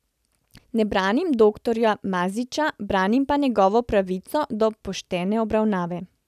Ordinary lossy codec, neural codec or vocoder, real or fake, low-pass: none; none; real; 14.4 kHz